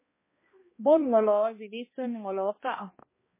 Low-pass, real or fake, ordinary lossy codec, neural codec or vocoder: 3.6 kHz; fake; MP3, 16 kbps; codec, 16 kHz, 1 kbps, X-Codec, HuBERT features, trained on balanced general audio